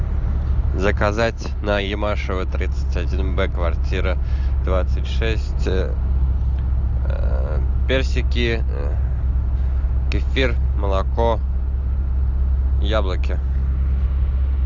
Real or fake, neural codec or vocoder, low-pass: real; none; 7.2 kHz